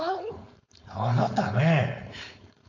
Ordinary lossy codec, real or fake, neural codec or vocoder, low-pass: AAC, 48 kbps; fake; codec, 16 kHz, 4.8 kbps, FACodec; 7.2 kHz